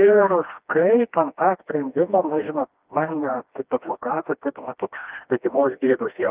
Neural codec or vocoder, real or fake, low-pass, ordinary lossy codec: codec, 16 kHz, 1 kbps, FreqCodec, smaller model; fake; 3.6 kHz; Opus, 32 kbps